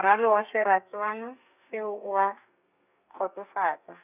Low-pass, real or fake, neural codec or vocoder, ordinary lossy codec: 3.6 kHz; fake; codec, 32 kHz, 1.9 kbps, SNAC; none